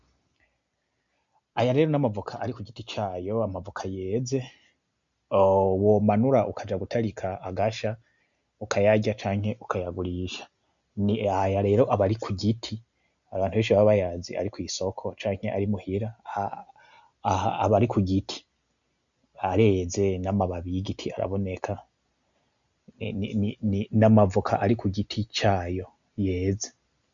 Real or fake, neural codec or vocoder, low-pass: real; none; 7.2 kHz